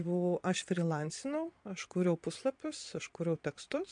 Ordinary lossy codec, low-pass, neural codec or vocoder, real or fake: MP3, 64 kbps; 9.9 kHz; vocoder, 22.05 kHz, 80 mel bands, Vocos; fake